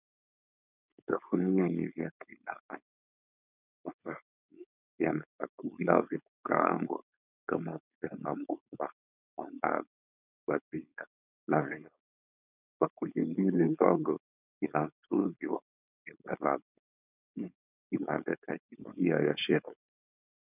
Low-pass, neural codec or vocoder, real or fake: 3.6 kHz; codec, 16 kHz, 4.8 kbps, FACodec; fake